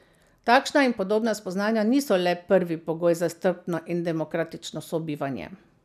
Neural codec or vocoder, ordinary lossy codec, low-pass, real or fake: none; none; 14.4 kHz; real